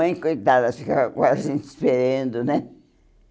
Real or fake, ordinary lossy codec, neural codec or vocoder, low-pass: real; none; none; none